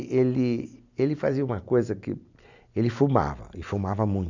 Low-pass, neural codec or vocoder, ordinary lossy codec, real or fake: 7.2 kHz; none; none; real